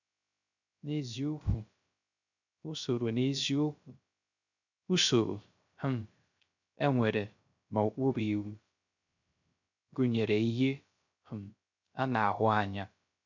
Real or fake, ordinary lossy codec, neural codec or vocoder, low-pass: fake; none; codec, 16 kHz, 0.3 kbps, FocalCodec; 7.2 kHz